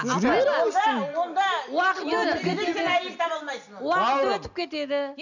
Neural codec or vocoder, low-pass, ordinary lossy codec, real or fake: none; 7.2 kHz; none; real